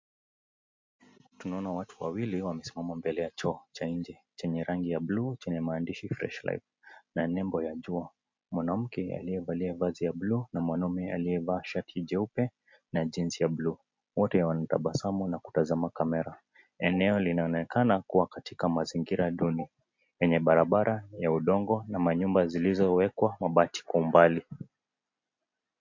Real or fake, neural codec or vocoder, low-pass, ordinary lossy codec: real; none; 7.2 kHz; MP3, 48 kbps